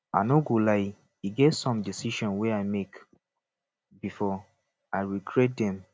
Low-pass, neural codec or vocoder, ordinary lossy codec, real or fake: none; none; none; real